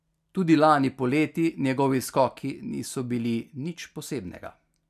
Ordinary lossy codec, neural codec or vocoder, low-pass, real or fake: none; none; 14.4 kHz; real